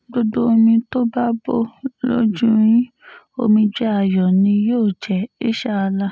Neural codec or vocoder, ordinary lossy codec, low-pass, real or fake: none; none; none; real